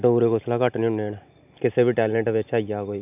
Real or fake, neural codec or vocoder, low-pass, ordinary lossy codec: real; none; 3.6 kHz; AAC, 32 kbps